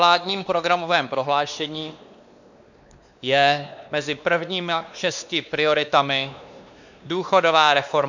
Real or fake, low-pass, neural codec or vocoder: fake; 7.2 kHz; codec, 16 kHz, 2 kbps, X-Codec, WavLM features, trained on Multilingual LibriSpeech